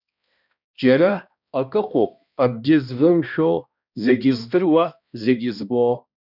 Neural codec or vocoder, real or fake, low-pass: codec, 16 kHz, 1 kbps, X-Codec, HuBERT features, trained on balanced general audio; fake; 5.4 kHz